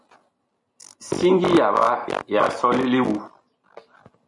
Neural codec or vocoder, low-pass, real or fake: none; 10.8 kHz; real